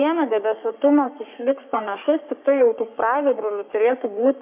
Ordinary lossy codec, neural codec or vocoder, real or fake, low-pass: AAC, 32 kbps; codec, 44.1 kHz, 3.4 kbps, Pupu-Codec; fake; 3.6 kHz